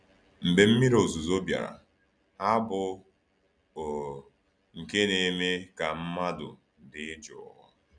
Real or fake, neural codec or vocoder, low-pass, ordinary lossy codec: real; none; 9.9 kHz; none